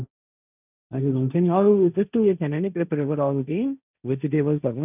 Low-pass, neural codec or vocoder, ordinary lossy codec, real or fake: 3.6 kHz; codec, 16 kHz, 1.1 kbps, Voila-Tokenizer; none; fake